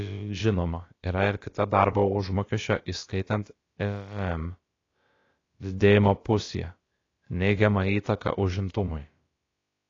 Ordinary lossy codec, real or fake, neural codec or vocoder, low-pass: AAC, 32 kbps; fake; codec, 16 kHz, about 1 kbps, DyCAST, with the encoder's durations; 7.2 kHz